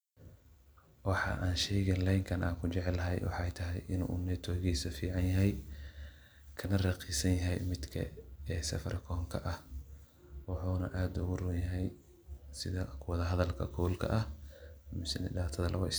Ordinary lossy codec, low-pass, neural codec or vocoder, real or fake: none; none; none; real